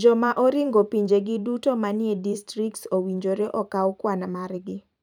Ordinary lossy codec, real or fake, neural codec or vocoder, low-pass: none; fake; vocoder, 44.1 kHz, 128 mel bands every 512 samples, BigVGAN v2; 19.8 kHz